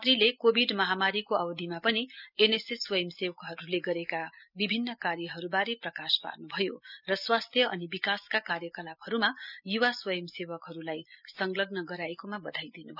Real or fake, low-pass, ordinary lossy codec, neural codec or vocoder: real; 5.4 kHz; none; none